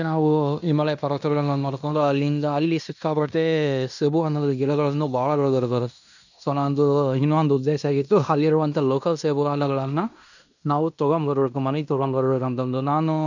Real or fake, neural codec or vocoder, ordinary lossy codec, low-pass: fake; codec, 16 kHz in and 24 kHz out, 0.9 kbps, LongCat-Audio-Codec, fine tuned four codebook decoder; none; 7.2 kHz